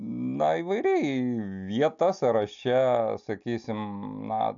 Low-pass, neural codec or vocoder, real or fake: 7.2 kHz; none; real